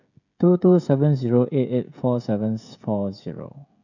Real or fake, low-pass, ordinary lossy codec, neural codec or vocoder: fake; 7.2 kHz; none; codec, 16 kHz, 16 kbps, FreqCodec, smaller model